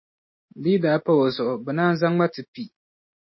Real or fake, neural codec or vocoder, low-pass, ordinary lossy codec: real; none; 7.2 kHz; MP3, 24 kbps